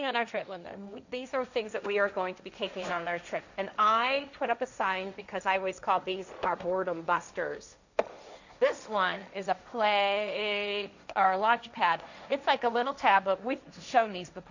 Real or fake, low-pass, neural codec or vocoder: fake; 7.2 kHz; codec, 16 kHz, 1.1 kbps, Voila-Tokenizer